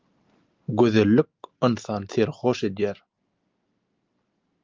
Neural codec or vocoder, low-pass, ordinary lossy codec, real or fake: none; 7.2 kHz; Opus, 24 kbps; real